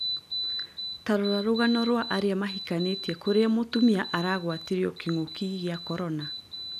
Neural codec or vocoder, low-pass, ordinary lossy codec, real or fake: none; 14.4 kHz; none; real